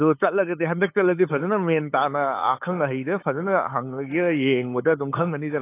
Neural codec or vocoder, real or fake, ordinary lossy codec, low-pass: codec, 16 kHz, 8 kbps, FunCodec, trained on LibriTTS, 25 frames a second; fake; AAC, 24 kbps; 3.6 kHz